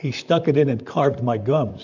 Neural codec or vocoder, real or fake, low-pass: vocoder, 44.1 kHz, 128 mel bands, Pupu-Vocoder; fake; 7.2 kHz